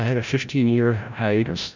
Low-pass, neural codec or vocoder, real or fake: 7.2 kHz; codec, 16 kHz, 0.5 kbps, FreqCodec, larger model; fake